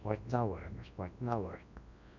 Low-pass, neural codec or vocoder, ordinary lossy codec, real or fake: 7.2 kHz; codec, 24 kHz, 0.9 kbps, WavTokenizer, large speech release; MP3, 64 kbps; fake